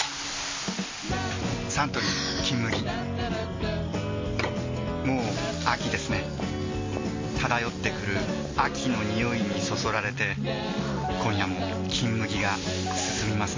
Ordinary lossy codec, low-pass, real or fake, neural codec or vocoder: MP3, 32 kbps; 7.2 kHz; real; none